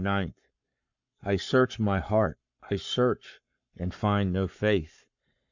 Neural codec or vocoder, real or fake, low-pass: codec, 44.1 kHz, 7.8 kbps, Pupu-Codec; fake; 7.2 kHz